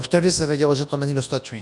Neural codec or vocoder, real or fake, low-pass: codec, 24 kHz, 0.9 kbps, WavTokenizer, large speech release; fake; 10.8 kHz